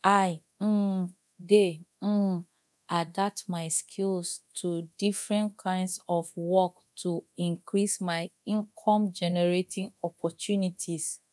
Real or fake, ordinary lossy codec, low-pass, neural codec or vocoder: fake; none; none; codec, 24 kHz, 0.9 kbps, DualCodec